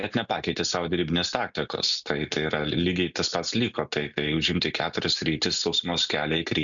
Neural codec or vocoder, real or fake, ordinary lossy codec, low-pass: none; real; MP3, 96 kbps; 7.2 kHz